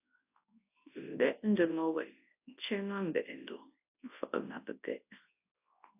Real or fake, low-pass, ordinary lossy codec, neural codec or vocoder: fake; 3.6 kHz; AAC, 32 kbps; codec, 24 kHz, 0.9 kbps, WavTokenizer, large speech release